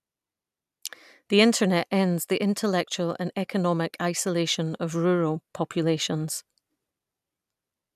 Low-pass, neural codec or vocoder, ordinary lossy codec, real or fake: 14.4 kHz; none; none; real